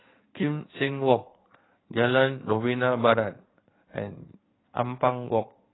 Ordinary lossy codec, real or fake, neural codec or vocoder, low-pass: AAC, 16 kbps; fake; codec, 16 kHz in and 24 kHz out, 2.2 kbps, FireRedTTS-2 codec; 7.2 kHz